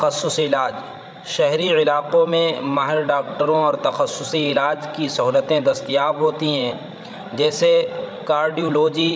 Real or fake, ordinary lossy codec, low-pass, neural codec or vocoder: fake; none; none; codec, 16 kHz, 16 kbps, FreqCodec, larger model